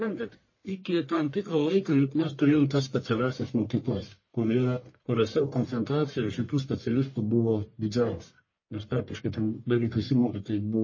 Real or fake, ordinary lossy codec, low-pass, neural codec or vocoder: fake; MP3, 32 kbps; 7.2 kHz; codec, 44.1 kHz, 1.7 kbps, Pupu-Codec